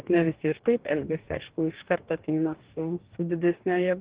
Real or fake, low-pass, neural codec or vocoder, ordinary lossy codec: fake; 3.6 kHz; codec, 44.1 kHz, 2.6 kbps, DAC; Opus, 16 kbps